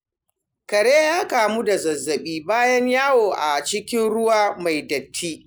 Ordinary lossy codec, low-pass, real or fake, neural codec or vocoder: none; none; real; none